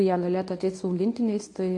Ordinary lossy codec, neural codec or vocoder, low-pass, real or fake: AAC, 48 kbps; codec, 24 kHz, 0.9 kbps, WavTokenizer, medium speech release version 1; 10.8 kHz; fake